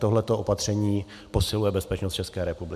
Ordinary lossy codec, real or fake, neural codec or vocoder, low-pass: MP3, 96 kbps; real; none; 14.4 kHz